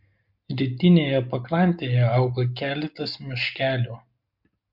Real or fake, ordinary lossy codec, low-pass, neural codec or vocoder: real; MP3, 48 kbps; 5.4 kHz; none